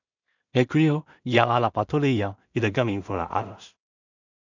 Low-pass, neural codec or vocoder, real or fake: 7.2 kHz; codec, 16 kHz in and 24 kHz out, 0.4 kbps, LongCat-Audio-Codec, two codebook decoder; fake